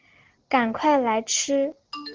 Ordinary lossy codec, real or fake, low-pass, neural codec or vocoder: Opus, 16 kbps; real; 7.2 kHz; none